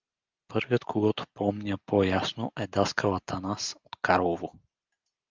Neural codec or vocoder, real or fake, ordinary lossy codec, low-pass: none; real; Opus, 32 kbps; 7.2 kHz